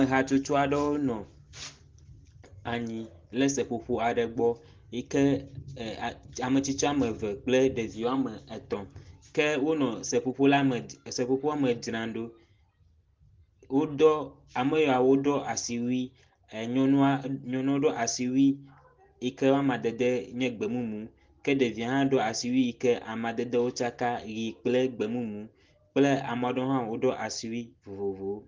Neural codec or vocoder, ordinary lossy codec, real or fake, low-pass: none; Opus, 16 kbps; real; 7.2 kHz